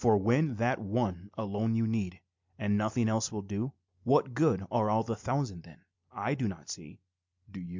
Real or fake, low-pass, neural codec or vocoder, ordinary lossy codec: real; 7.2 kHz; none; MP3, 64 kbps